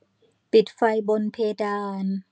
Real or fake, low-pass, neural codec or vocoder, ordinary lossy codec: real; none; none; none